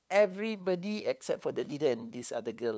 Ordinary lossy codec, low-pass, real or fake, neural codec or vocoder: none; none; fake; codec, 16 kHz, 2 kbps, FunCodec, trained on LibriTTS, 25 frames a second